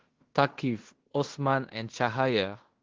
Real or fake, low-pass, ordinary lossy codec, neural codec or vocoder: fake; 7.2 kHz; Opus, 16 kbps; codec, 16 kHz in and 24 kHz out, 0.9 kbps, LongCat-Audio-Codec, fine tuned four codebook decoder